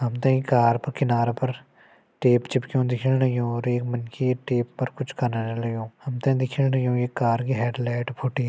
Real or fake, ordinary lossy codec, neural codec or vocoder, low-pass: real; none; none; none